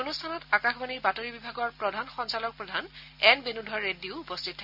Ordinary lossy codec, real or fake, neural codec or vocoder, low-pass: none; real; none; 5.4 kHz